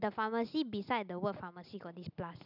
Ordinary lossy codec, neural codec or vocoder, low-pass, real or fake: none; none; 5.4 kHz; real